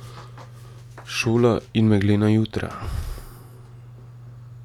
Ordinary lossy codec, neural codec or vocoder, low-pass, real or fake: none; none; 19.8 kHz; real